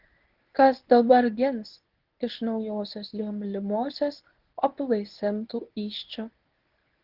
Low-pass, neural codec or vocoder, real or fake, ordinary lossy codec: 5.4 kHz; codec, 24 kHz, 0.9 kbps, WavTokenizer, medium speech release version 1; fake; Opus, 16 kbps